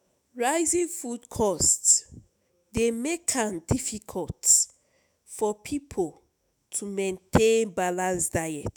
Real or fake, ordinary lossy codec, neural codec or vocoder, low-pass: fake; none; autoencoder, 48 kHz, 128 numbers a frame, DAC-VAE, trained on Japanese speech; none